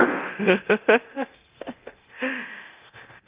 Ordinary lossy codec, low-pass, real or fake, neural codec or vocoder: Opus, 32 kbps; 3.6 kHz; fake; codec, 24 kHz, 0.9 kbps, DualCodec